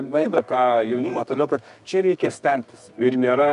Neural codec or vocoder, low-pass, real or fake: codec, 24 kHz, 0.9 kbps, WavTokenizer, medium music audio release; 10.8 kHz; fake